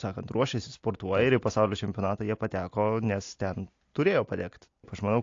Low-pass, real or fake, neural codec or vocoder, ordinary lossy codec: 7.2 kHz; real; none; AAC, 48 kbps